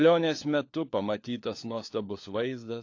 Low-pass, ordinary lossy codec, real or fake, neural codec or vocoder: 7.2 kHz; AAC, 48 kbps; fake; codec, 16 kHz, 4 kbps, FunCodec, trained on LibriTTS, 50 frames a second